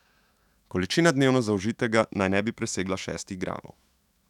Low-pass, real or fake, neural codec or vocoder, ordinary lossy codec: 19.8 kHz; fake; autoencoder, 48 kHz, 128 numbers a frame, DAC-VAE, trained on Japanese speech; none